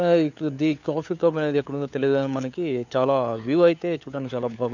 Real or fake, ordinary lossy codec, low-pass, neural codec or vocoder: fake; none; 7.2 kHz; codec, 16 kHz, 4 kbps, FunCodec, trained on LibriTTS, 50 frames a second